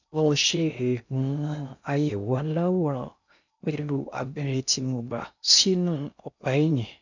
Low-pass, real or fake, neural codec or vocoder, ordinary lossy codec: 7.2 kHz; fake; codec, 16 kHz in and 24 kHz out, 0.6 kbps, FocalCodec, streaming, 2048 codes; none